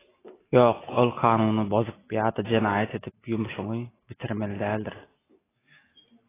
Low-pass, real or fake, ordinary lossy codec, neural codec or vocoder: 3.6 kHz; real; AAC, 16 kbps; none